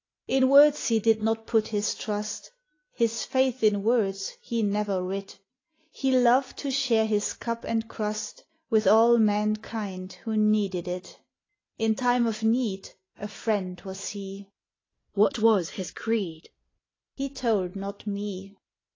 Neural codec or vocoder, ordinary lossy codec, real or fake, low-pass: none; AAC, 32 kbps; real; 7.2 kHz